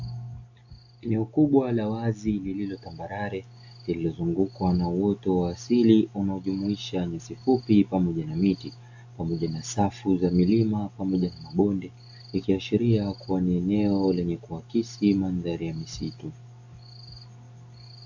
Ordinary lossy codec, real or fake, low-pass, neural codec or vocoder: AAC, 48 kbps; real; 7.2 kHz; none